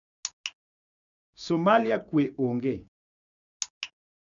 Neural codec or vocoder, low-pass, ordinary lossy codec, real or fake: codec, 16 kHz, 6 kbps, DAC; 7.2 kHz; none; fake